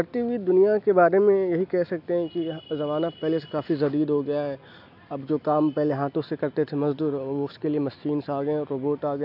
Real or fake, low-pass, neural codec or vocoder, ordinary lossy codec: real; 5.4 kHz; none; AAC, 48 kbps